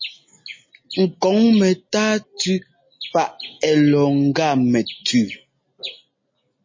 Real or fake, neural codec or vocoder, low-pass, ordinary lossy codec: real; none; 7.2 kHz; MP3, 32 kbps